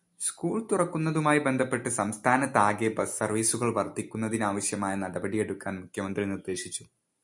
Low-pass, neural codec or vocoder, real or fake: 10.8 kHz; none; real